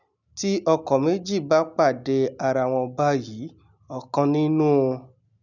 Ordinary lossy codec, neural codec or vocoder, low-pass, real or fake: none; none; 7.2 kHz; real